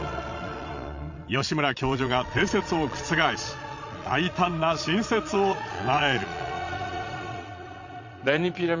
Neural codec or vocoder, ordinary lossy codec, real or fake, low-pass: vocoder, 22.05 kHz, 80 mel bands, Vocos; Opus, 64 kbps; fake; 7.2 kHz